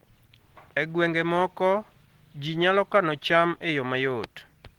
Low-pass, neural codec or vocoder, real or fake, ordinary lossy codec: 19.8 kHz; none; real; Opus, 16 kbps